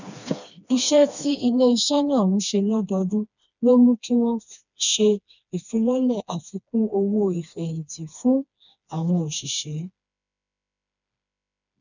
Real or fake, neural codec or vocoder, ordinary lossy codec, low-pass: fake; codec, 16 kHz, 2 kbps, FreqCodec, smaller model; none; 7.2 kHz